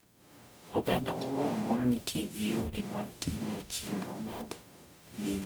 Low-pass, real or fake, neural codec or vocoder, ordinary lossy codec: none; fake; codec, 44.1 kHz, 0.9 kbps, DAC; none